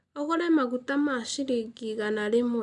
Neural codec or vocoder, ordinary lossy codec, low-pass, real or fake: none; none; 10.8 kHz; real